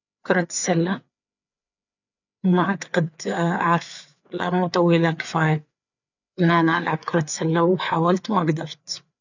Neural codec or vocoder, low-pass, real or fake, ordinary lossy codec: codec, 16 kHz, 4 kbps, FreqCodec, larger model; 7.2 kHz; fake; none